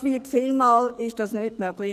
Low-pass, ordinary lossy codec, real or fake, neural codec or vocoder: 14.4 kHz; none; fake; codec, 44.1 kHz, 2.6 kbps, SNAC